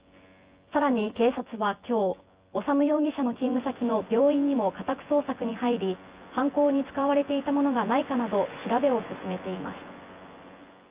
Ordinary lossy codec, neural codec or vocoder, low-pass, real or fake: Opus, 24 kbps; vocoder, 24 kHz, 100 mel bands, Vocos; 3.6 kHz; fake